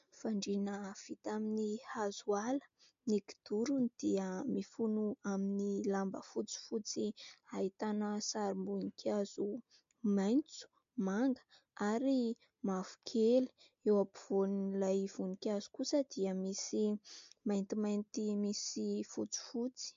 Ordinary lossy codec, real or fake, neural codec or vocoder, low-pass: MP3, 48 kbps; real; none; 7.2 kHz